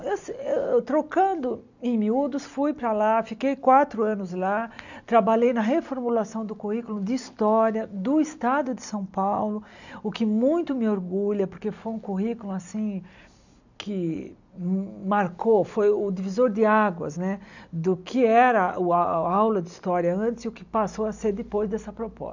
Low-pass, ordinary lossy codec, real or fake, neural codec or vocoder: 7.2 kHz; none; real; none